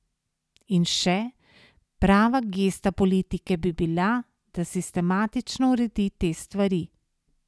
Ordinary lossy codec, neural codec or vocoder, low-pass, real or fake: none; none; none; real